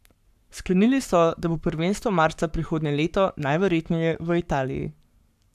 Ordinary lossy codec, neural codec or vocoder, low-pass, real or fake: none; codec, 44.1 kHz, 7.8 kbps, Pupu-Codec; 14.4 kHz; fake